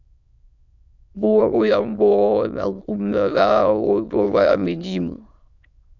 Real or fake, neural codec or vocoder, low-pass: fake; autoencoder, 22.05 kHz, a latent of 192 numbers a frame, VITS, trained on many speakers; 7.2 kHz